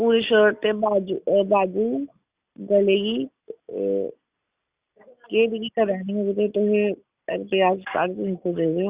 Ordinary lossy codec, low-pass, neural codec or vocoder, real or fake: Opus, 64 kbps; 3.6 kHz; none; real